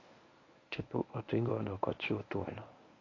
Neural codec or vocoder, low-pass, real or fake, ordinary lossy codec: codec, 24 kHz, 0.9 kbps, WavTokenizer, medium speech release version 1; 7.2 kHz; fake; AAC, 32 kbps